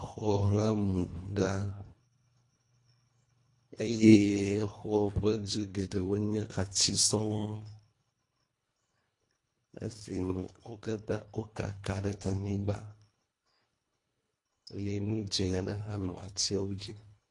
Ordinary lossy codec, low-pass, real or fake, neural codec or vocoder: AAC, 48 kbps; 10.8 kHz; fake; codec, 24 kHz, 1.5 kbps, HILCodec